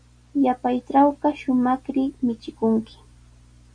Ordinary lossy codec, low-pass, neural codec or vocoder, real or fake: MP3, 48 kbps; 9.9 kHz; none; real